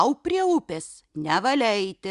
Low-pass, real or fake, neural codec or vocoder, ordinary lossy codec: 10.8 kHz; real; none; Opus, 64 kbps